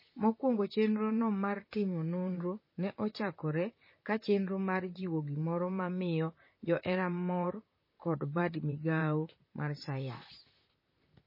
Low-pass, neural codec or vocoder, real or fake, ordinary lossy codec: 5.4 kHz; vocoder, 44.1 kHz, 128 mel bands, Pupu-Vocoder; fake; MP3, 24 kbps